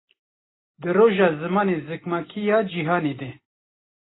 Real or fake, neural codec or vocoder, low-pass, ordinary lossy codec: real; none; 7.2 kHz; AAC, 16 kbps